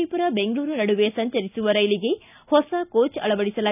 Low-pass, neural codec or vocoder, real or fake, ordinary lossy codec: 3.6 kHz; none; real; none